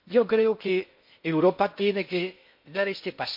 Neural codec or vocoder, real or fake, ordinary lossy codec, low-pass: codec, 16 kHz in and 24 kHz out, 0.8 kbps, FocalCodec, streaming, 65536 codes; fake; MP3, 32 kbps; 5.4 kHz